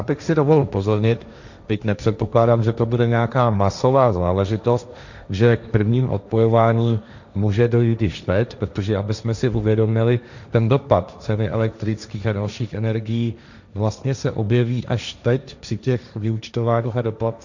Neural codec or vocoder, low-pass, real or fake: codec, 16 kHz, 1.1 kbps, Voila-Tokenizer; 7.2 kHz; fake